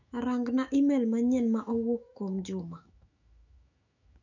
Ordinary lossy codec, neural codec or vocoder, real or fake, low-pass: none; autoencoder, 48 kHz, 128 numbers a frame, DAC-VAE, trained on Japanese speech; fake; 7.2 kHz